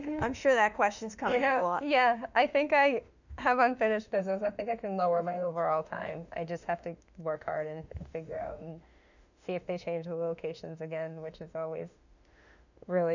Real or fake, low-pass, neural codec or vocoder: fake; 7.2 kHz; autoencoder, 48 kHz, 32 numbers a frame, DAC-VAE, trained on Japanese speech